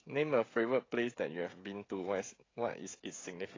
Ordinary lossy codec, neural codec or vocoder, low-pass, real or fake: AAC, 32 kbps; codec, 16 kHz, 16 kbps, FreqCodec, smaller model; 7.2 kHz; fake